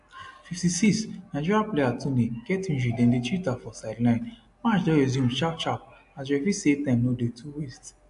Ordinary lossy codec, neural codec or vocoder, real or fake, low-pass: AAC, 64 kbps; none; real; 10.8 kHz